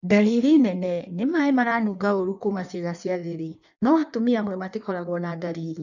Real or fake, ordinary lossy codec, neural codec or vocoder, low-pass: fake; none; codec, 16 kHz in and 24 kHz out, 1.1 kbps, FireRedTTS-2 codec; 7.2 kHz